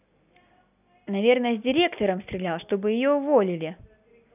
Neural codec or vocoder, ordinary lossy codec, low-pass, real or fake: none; none; 3.6 kHz; real